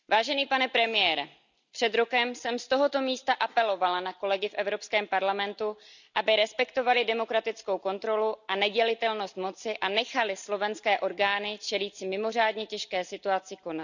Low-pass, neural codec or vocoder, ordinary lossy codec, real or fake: 7.2 kHz; none; none; real